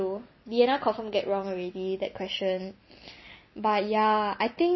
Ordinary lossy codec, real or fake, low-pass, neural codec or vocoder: MP3, 24 kbps; real; 7.2 kHz; none